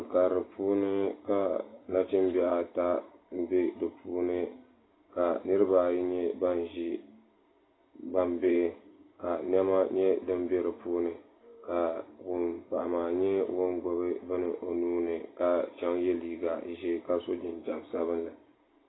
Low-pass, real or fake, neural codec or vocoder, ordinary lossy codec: 7.2 kHz; real; none; AAC, 16 kbps